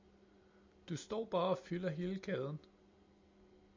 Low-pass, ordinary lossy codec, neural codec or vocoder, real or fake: 7.2 kHz; MP3, 64 kbps; none; real